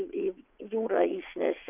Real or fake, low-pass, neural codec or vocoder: fake; 3.6 kHz; vocoder, 22.05 kHz, 80 mel bands, WaveNeXt